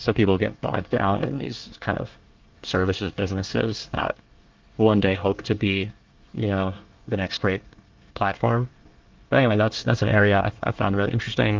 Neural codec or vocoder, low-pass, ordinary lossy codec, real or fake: codec, 24 kHz, 1 kbps, SNAC; 7.2 kHz; Opus, 32 kbps; fake